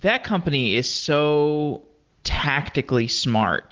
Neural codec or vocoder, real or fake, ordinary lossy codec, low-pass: none; real; Opus, 16 kbps; 7.2 kHz